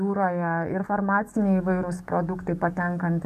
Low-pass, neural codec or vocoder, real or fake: 14.4 kHz; codec, 44.1 kHz, 7.8 kbps, DAC; fake